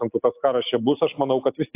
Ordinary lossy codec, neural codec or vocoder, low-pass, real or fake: AAC, 24 kbps; none; 3.6 kHz; real